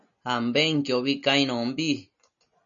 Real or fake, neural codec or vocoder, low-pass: real; none; 7.2 kHz